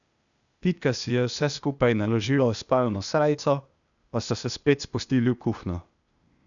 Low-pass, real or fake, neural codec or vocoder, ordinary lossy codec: 7.2 kHz; fake; codec, 16 kHz, 0.8 kbps, ZipCodec; none